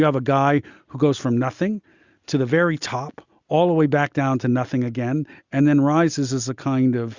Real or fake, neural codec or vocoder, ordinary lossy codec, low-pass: real; none; Opus, 64 kbps; 7.2 kHz